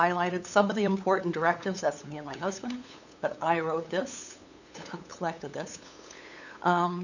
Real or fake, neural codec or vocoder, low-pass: fake; codec, 16 kHz, 8 kbps, FunCodec, trained on LibriTTS, 25 frames a second; 7.2 kHz